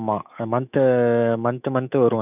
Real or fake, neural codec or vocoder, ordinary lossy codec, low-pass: fake; autoencoder, 48 kHz, 128 numbers a frame, DAC-VAE, trained on Japanese speech; none; 3.6 kHz